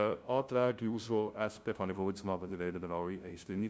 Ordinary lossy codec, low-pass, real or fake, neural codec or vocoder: none; none; fake; codec, 16 kHz, 0.5 kbps, FunCodec, trained on LibriTTS, 25 frames a second